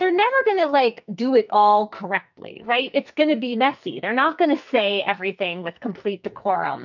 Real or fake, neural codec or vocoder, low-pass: fake; codec, 44.1 kHz, 2.6 kbps, SNAC; 7.2 kHz